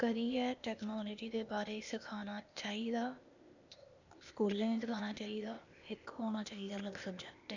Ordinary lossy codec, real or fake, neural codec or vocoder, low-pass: none; fake; codec, 16 kHz, 0.8 kbps, ZipCodec; 7.2 kHz